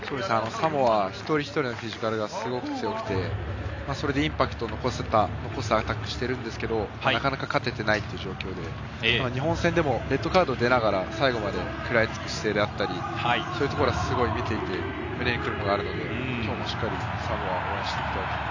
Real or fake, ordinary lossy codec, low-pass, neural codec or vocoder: real; AAC, 48 kbps; 7.2 kHz; none